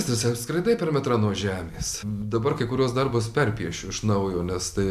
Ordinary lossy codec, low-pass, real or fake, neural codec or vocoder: MP3, 96 kbps; 14.4 kHz; real; none